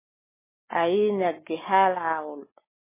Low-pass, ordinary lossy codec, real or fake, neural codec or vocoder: 3.6 kHz; MP3, 16 kbps; real; none